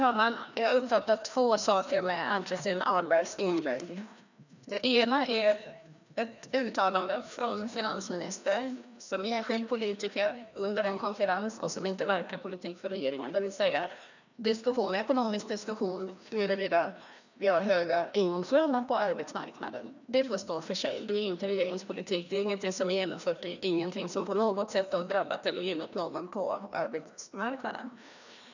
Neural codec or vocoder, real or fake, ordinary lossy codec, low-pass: codec, 16 kHz, 1 kbps, FreqCodec, larger model; fake; none; 7.2 kHz